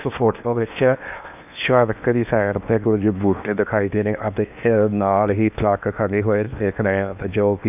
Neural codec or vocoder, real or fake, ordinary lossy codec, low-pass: codec, 16 kHz in and 24 kHz out, 0.8 kbps, FocalCodec, streaming, 65536 codes; fake; none; 3.6 kHz